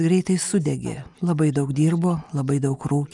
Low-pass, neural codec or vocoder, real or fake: 10.8 kHz; none; real